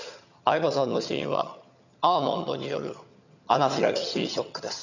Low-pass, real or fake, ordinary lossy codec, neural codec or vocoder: 7.2 kHz; fake; none; vocoder, 22.05 kHz, 80 mel bands, HiFi-GAN